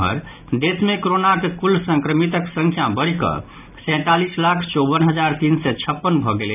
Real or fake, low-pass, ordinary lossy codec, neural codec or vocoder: real; 3.6 kHz; none; none